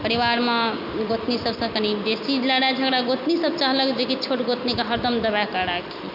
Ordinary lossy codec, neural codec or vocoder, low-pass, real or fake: none; none; 5.4 kHz; real